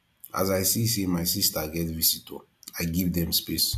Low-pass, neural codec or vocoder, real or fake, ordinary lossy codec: 14.4 kHz; none; real; MP3, 96 kbps